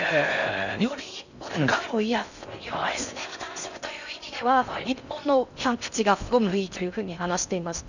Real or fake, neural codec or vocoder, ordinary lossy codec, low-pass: fake; codec, 16 kHz in and 24 kHz out, 0.6 kbps, FocalCodec, streaming, 4096 codes; none; 7.2 kHz